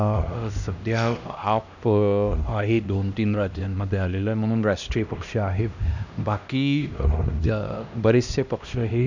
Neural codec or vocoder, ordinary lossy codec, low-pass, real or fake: codec, 16 kHz, 1 kbps, X-Codec, HuBERT features, trained on LibriSpeech; none; 7.2 kHz; fake